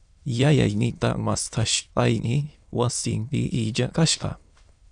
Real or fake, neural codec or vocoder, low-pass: fake; autoencoder, 22.05 kHz, a latent of 192 numbers a frame, VITS, trained on many speakers; 9.9 kHz